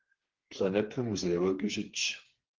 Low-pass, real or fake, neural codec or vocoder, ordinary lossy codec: 7.2 kHz; fake; codec, 16 kHz, 4 kbps, FreqCodec, smaller model; Opus, 16 kbps